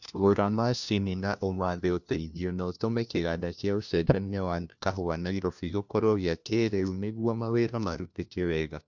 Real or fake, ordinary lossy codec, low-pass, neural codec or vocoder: fake; none; 7.2 kHz; codec, 16 kHz, 1 kbps, FunCodec, trained on LibriTTS, 50 frames a second